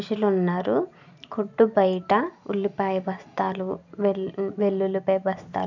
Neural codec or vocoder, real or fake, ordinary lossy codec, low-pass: none; real; none; 7.2 kHz